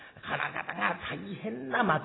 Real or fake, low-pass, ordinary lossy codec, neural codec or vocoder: real; 7.2 kHz; AAC, 16 kbps; none